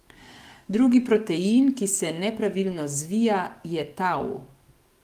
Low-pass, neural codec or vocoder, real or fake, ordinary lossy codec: 14.4 kHz; codec, 44.1 kHz, 7.8 kbps, DAC; fake; Opus, 32 kbps